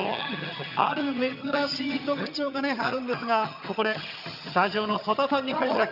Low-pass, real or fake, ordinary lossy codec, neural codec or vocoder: 5.4 kHz; fake; none; vocoder, 22.05 kHz, 80 mel bands, HiFi-GAN